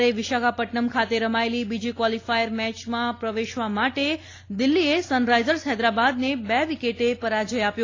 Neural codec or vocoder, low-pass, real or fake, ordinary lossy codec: none; 7.2 kHz; real; AAC, 32 kbps